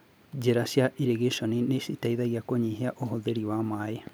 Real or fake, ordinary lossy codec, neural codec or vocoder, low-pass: real; none; none; none